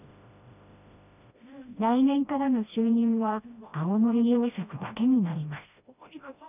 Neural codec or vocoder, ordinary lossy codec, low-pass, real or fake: codec, 16 kHz, 1 kbps, FreqCodec, smaller model; none; 3.6 kHz; fake